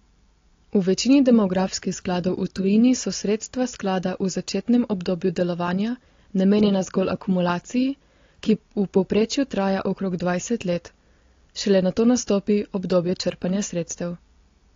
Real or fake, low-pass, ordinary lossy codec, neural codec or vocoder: real; 7.2 kHz; AAC, 32 kbps; none